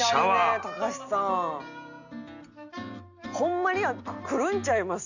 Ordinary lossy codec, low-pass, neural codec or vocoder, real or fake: none; 7.2 kHz; none; real